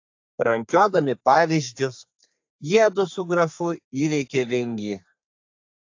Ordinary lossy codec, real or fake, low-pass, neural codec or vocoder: AAC, 48 kbps; fake; 7.2 kHz; codec, 32 kHz, 1.9 kbps, SNAC